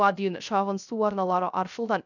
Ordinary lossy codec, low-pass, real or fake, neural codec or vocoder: none; 7.2 kHz; fake; codec, 16 kHz, 0.3 kbps, FocalCodec